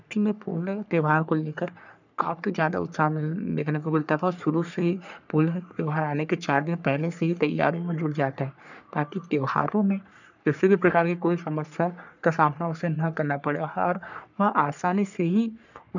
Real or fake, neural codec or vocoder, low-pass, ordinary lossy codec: fake; codec, 44.1 kHz, 3.4 kbps, Pupu-Codec; 7.2 kHz; none